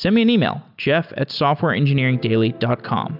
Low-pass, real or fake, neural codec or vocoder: 5.4 kHz; real; none